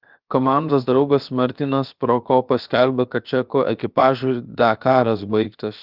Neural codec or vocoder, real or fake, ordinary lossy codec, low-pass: codec, 16 kHz, 0.7 kbps, FocalCodec; fake; Opus, 24 kbps; 5.4 kHz